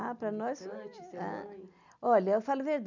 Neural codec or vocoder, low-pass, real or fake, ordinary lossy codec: none; 7.2 kHz; real; none